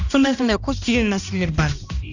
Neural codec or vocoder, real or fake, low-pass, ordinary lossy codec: codec, 16 kHz, 1 kbps, X-Codec, HuBERT features, trained on balanced general audio; fake; 7.2 kHz; none